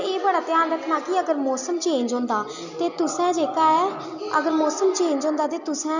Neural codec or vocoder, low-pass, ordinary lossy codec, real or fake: none; 7.2 kHz; none; real